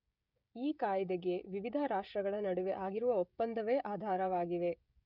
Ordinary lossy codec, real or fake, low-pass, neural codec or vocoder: none; fake; 5.4 kHz; codec, 16 kHz, 16 kbps, FreqCodec, smaller model